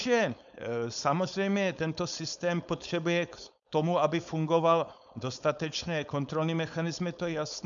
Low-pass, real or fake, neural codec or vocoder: 7.2 kHz; fake; codec, 16 kHz, 4.8 kbps, FACodec